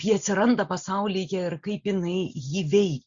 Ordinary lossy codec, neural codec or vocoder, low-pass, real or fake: Opus, 64 kbps; none; 7.2 kHz; real